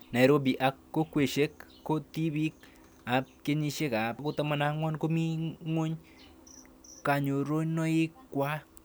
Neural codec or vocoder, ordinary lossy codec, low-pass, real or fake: none; none; none; real